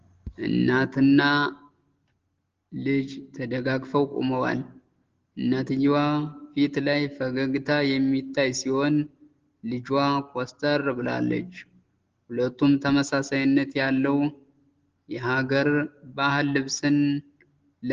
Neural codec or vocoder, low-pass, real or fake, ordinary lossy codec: none; 7.2 kHz; real; Opus, 16 kbps